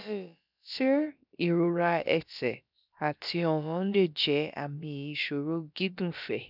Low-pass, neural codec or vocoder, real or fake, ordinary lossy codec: 5.4 kHz; codec, 16 kHz, about 1 kbps, DyCAST, with the encoder's durations; fake; none